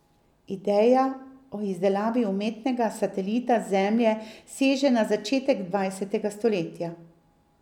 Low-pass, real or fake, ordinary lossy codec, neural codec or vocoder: 19.8 kHz; real; none; none